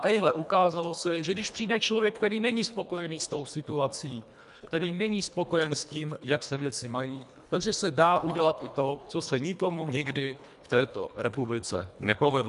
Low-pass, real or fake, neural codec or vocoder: 10.8 kHz; fake; codec, 24 kHz, 1.5 kbps, HILCodec